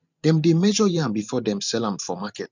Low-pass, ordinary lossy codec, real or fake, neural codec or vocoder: 7.2 kHz; none; fake; vocoder, 44.1 kHz, 128 mel bands every 512 samples, BigVGAN v2